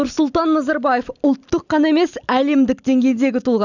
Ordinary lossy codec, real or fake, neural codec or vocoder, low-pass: none; real; none; 7.2 kHz